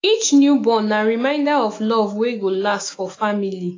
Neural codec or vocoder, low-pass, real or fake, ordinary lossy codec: autoencoder, 48 kHz, 128 numbers a frame, DAC-VAE, trained on Japanese speech; 7.2 kHz; fake; AAC, 32 kbps